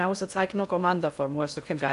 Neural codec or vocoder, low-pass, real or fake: codec, 16 kHz in and 24 kHz out, 0.6 kbps, FocalCodec, streaming, 4096 codes; 10.8 kHz; fake